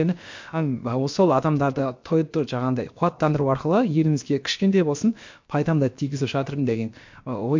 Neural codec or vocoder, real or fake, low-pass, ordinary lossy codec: codec, 16 kHz, about 1 kbps, DyCAST, with the encoder's durations; fake; 7.2 kHz; MP3, 48 kbps